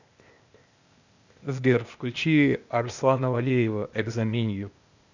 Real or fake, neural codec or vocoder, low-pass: fake; codec, 16 kHz, 0.8 kbps, ZipCodec; 7.2 kHz